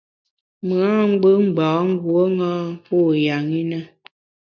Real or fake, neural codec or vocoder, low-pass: real; none; 7.2 kHz